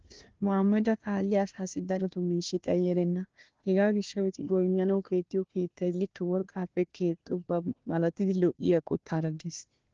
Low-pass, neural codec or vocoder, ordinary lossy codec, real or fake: 7.2 kHz; codec, 16 kHz, 1 kbps, FunCodec, trained on Chinese and English, 50 frames a second; Opus, 16 kbps; fake